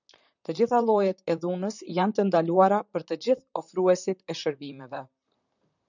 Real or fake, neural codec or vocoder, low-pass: fake; vocoder, 44.1 kHz, 128 mel bands, Pupu-Vocoder; 7.2 kHz